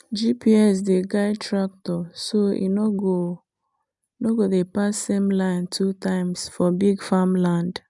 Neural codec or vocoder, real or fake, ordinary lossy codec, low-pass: none; real; none; 10.8 kHz